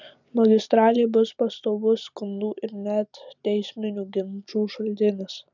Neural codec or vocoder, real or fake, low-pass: none; real; 7.2 kHz